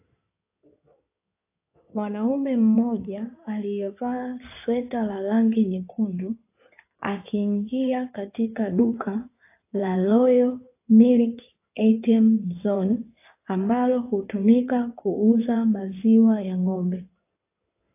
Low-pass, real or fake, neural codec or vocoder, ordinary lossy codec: 3.6 kHz; fake; codec, 16 kHz in and 24 kHz out, 2.2 kbps, FireRedTTS-2 codec; AAC, 24 kbps